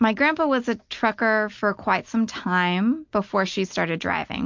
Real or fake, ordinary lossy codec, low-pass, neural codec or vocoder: real; MP3, 48 kbps; 7.2 kHz; none